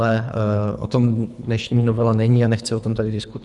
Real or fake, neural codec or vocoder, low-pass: fake; codec, 24 kHz, 3 kbps, HILCodec; 10.8 kHz